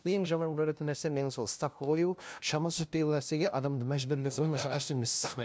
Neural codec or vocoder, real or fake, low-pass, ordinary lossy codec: codec, 16 kHz, 0.5 kbps, FunCodec, trained on LibriTTS, 25 frames a second; fake; none; none